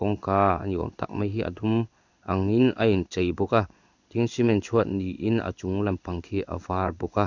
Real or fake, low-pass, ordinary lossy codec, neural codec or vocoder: fake; 7.2 kHz; none; codec, 16 kHz in and 24 kHz out, 1 kbps, XY-Tokenizer